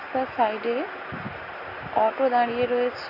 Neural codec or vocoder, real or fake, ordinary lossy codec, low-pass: none; real; AAC, 48 kbps; 5.4 kHz